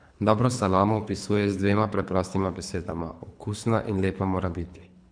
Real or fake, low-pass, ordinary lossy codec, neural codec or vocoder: fake; 9.9 kHz; none; codec, 24 kHz, 3 kbps, HILCodec